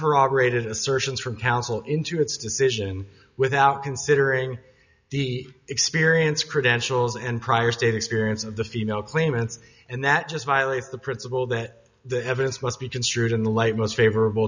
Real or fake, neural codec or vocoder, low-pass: real; none; 7.2 kHz